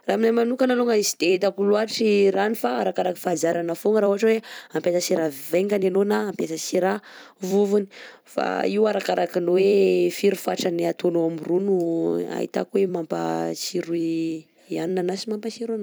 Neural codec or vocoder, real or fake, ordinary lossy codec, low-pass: vocoder, 44.1 kHz, 128 mel bands every 256 samples, BigVGAN v2; fake; none; none